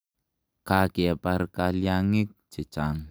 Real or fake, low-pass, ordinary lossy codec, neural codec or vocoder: real; none; none; none